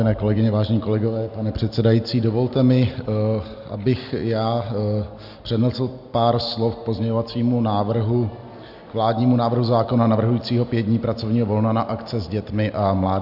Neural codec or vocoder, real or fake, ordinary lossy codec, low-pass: none; real; AAC, 48 kbps; 5.4 kHz